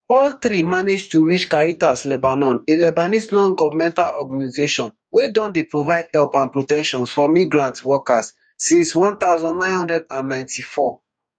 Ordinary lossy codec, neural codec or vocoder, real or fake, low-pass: none; codec, 44.1 kHz, 2.6 kbps, DAC; fake; 9.9 kHz